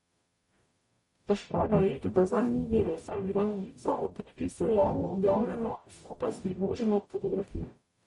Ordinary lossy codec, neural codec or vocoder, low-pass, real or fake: MP3, 48 kbps; codec, 44.1 kHz, 0.9 kbps, DAC; 19.8 kHz; fake